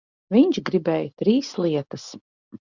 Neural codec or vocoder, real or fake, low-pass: none; real; 7.2 kHz